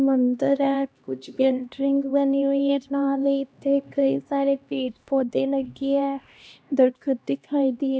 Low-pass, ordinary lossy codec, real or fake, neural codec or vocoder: none; none; fake; codec, 16 kHz, 1 kbps, X-Codec, HuBERT features, trained on LibriSpeech